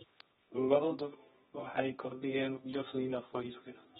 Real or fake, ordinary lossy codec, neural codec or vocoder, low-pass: fake; AAC, 16 kbps; codec, 24 kHz, 0.9 kbps, WavTokenizer, medium music audio release; 10.8 kHz